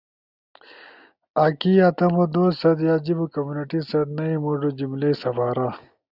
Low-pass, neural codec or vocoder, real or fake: 5.4 kHz; none; real